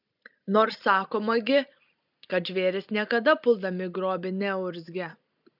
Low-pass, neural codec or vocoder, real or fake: 5.4 kHz; none; real